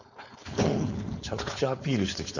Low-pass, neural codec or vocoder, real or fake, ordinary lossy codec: 7.2 kHz; codec, 16 kHz, 4.8 kbps, FACodec; fake; none